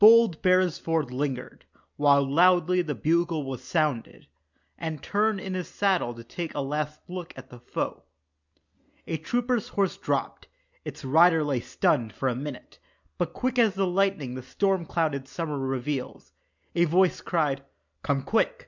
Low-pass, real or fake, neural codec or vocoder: 7.2 kHz; real; none